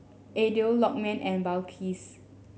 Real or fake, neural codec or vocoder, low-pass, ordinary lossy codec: real; none; none; none